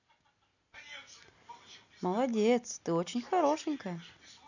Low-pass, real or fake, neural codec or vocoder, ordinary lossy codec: 7.2 kHz; real; none; none